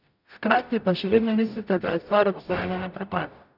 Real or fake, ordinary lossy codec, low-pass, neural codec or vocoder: fake; none; 5.4 kHz; codec, 44.1 kHz, 0.9 kbps, DAC